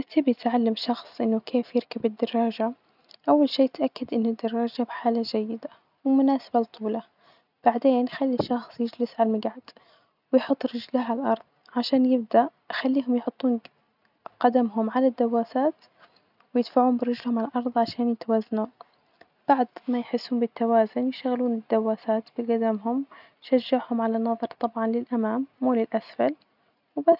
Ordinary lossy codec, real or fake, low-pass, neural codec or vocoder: none; real; 5.4 kHz; none